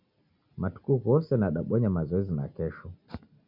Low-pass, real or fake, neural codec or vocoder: 5.4 kHz; real; none